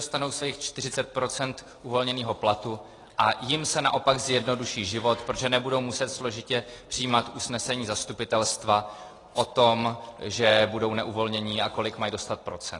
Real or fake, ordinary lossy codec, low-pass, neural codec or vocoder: real; AAC, 32 kbps; 10.8 kHz; none